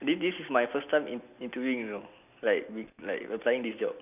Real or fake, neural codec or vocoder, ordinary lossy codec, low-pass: real; none; none; 3.6 kHz